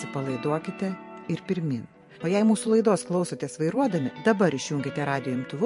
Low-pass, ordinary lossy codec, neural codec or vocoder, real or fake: 14.4 kHz; MP3, 48 kbps; vocoder, 44.1 kHz, 128 mel bands every 512 samples, BigVGAN v2; fake